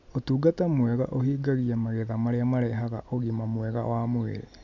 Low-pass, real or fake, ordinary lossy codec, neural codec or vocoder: 7.2 kHz; real; none; none